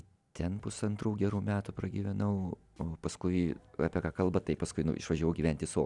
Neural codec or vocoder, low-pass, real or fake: none; 10.8 kHz; real